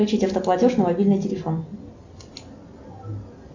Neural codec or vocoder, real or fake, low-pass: none; real; 7.2 kHz